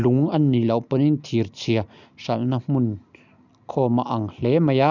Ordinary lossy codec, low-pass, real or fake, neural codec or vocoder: none; 7.2 kHz; real; none